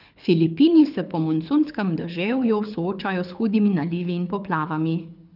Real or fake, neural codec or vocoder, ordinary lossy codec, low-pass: fake; codec, 24 kHz, 6 kbps, HILCodec; none; 5.4 kHz